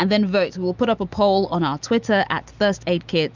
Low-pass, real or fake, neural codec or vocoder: 7.2 kHz; real; none